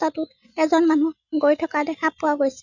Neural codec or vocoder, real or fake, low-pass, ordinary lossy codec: none; real; 7.2 kHz; none